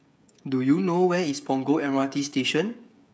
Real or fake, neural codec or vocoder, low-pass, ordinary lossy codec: fake; codec, 16 kHz, 16 kbps, FreqCodec, smaller model; none; none